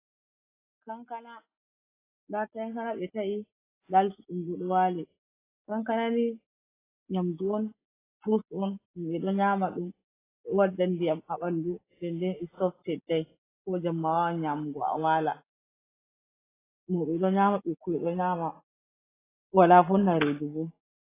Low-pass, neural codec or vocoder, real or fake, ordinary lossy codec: 3.6 kHz; none; real; AAC, 16 kbps